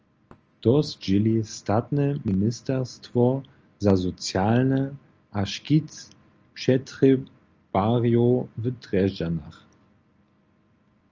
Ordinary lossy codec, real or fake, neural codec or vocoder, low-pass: Opus, 24 kbps; real; none; 7.2 kHz